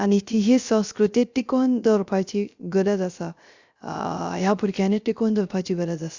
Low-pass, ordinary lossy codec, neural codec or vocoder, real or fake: 7.2 kHz; Opus, 64 kbps; codec, 16 kHz, 0.3 kbps, FocalCodec; fake